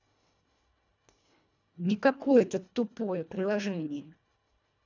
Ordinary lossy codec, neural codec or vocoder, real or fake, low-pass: none; codec, 24 kHz, 1.5 kbps, HILCodec; fake; 7.2 kHz